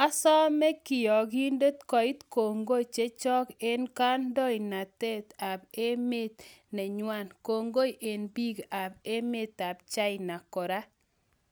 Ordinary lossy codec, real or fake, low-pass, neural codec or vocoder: none; real; none; none